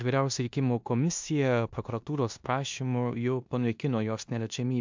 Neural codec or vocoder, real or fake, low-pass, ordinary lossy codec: codec, 16 kHz in and 24 kHz out, 0.9 kbps, LongCat-Audio-Codec, four codebook decoder; fake; 7.2 kHz; MP3, 64 kbps